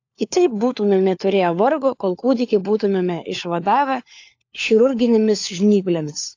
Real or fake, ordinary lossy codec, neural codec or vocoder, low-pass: fake; AAC, 48 kbps; codec, 16 kHz, 4 kbps, FunCodec, trained on LibriTTS, 50 frames a second; 7.2 kHz